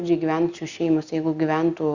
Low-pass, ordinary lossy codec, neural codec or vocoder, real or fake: 7.2 kHz; Opus, 64 kbps; none; real